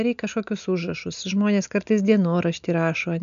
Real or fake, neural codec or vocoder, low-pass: real; none; 7.2 kHz